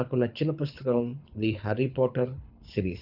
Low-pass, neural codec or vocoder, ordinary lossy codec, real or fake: 5.4 kHz; codec, 24 kHz, 6 kbps, HILCodec; none; fake